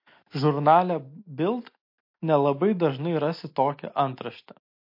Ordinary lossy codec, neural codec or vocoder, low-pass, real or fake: MP3, 32 kbps; none; 5.4 kHz; real